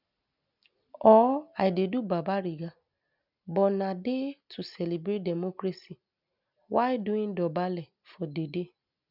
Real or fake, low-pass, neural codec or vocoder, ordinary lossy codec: real; 5.4 kHz; none; none